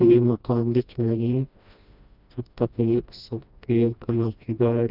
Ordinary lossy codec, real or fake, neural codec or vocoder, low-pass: none; fake; codec, 16 kHz, 1 kbps, FreqCodec, smaller model; 5.4 kHz